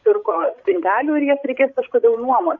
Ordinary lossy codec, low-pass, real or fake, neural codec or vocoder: AAC, 48 kbps; 7.2 kHz; fake; codec, 16 kHz, 16 kbps, FreqCodec, larger model